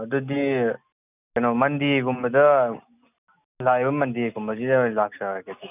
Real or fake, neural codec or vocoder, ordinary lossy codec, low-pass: real; none; none; 3.6 kHz